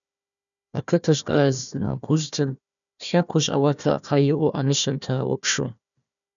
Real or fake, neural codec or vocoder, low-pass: fake; codec, 16 kHz, 1 kbps, FunCodec, trained on Chinese and English, 50 frames a second; 7.2 kHz